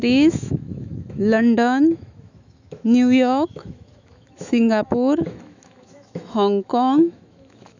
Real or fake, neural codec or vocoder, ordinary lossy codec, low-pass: fake; autoencoder, 48 kHz, 128 numbers a frame, DAC-VAE, trained on Japanese speech; none; 7.2 kHz